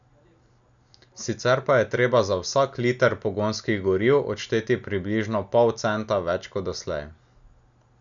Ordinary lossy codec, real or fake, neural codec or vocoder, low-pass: none; real; none; 7.2 kHz